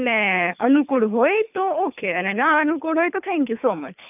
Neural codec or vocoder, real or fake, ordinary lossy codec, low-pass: codec, 24 kHz, 3 kbps, HILCodec; fake; none; 3.6 kHz